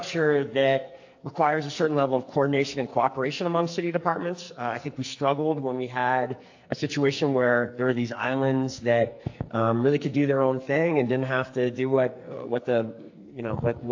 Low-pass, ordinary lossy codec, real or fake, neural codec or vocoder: 7.2 kHz; AAC, 48 kbps; fake; codec, 44.1 kHz, 2.6 kbps, SNAC